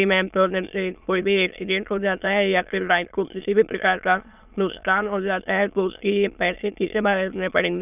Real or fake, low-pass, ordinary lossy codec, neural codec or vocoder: fake; 3.6 kHz; none; autoencoder, 22.05 kHz, a latent of 192 numbers a frame, VITS, trained on many speakers